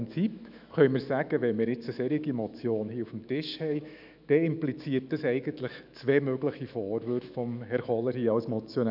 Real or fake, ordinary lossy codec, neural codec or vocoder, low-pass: fake; none; autoencoder, 48 kHz, 128 numbers a frame, DAC-VAE, trained on Japanese speech; 5.4 kHz